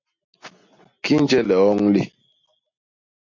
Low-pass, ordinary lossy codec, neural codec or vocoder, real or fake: 7.2 kHz; MP3, 48 kbps; none; real